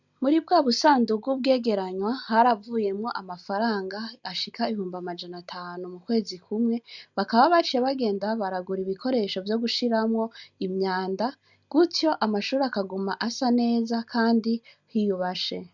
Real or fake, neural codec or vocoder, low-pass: real; none; 7.2 kHz